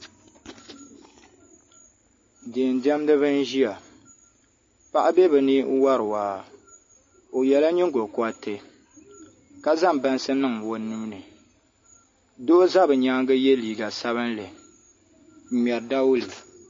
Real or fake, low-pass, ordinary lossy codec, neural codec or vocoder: real; 7.2 kHz; MP3, 32 kbps; none